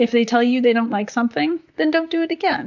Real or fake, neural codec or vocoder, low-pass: fake; vocoder, 44.1 kHz, 128 mel bands, Pupu-Vocoder; 7.2 kHz